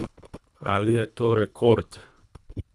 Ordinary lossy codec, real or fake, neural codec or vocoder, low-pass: none; fake; codec, 24 kHz, 1.5 kbps, HILCodec; none